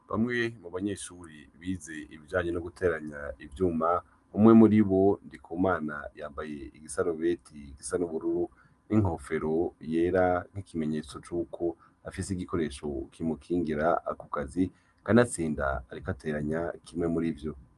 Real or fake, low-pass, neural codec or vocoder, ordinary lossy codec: real; 10.8 kHz; none; Opus, 32 kbps